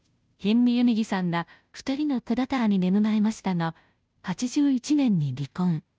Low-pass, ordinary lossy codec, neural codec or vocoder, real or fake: none; none; codec, 16 kHz, 0.5 kbps, FunCodec, trained on Chinese and English, 25 frames a second; fake